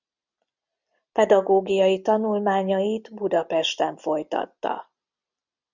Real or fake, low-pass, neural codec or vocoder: real; 7.2 kHz; none